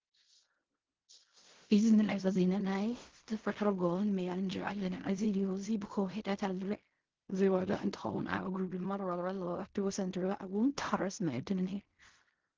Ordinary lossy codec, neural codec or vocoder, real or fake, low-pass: Opus, 16 kbps; codec, 16 kHz in and 24 kHz out, 0.4 kbps, LongCat-Audio-Codec, fine tuned four codebook decoder; fake; 7.2 kHz